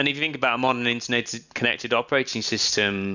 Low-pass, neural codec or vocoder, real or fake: 7.2 kHz; none; real